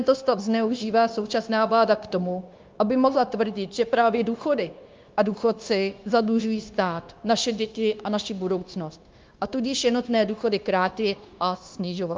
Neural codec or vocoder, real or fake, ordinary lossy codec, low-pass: codec, 16 kHz, 0.9 kbps, LongCat-Audio-Codec; fake; Opus, 32 kbps; 7.2 kHz